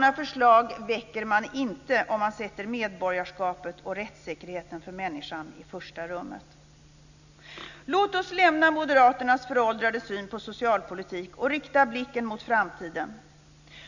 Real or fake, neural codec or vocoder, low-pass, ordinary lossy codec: real; none; 7.2 kHz; none